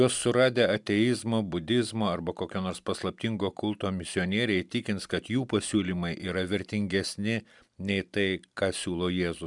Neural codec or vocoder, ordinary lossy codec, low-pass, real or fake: none; MP3, 96 kbps; 10.8 kHz; real